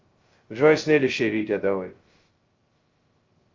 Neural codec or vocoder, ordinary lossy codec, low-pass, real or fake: codec, 16 kHz, 0.2 kbps, FocalCodec; Opus, 32 kbps; 7.2 kHz; fake